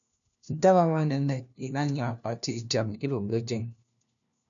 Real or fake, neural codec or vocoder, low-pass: fake; codec, 16 kHz, 1 kbps, FunCodec, trained on LibriTTS, 50 frames a second; 7.2 kHz